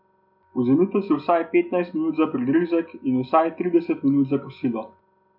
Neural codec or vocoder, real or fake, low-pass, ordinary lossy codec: none; real; 5.4 kHz; none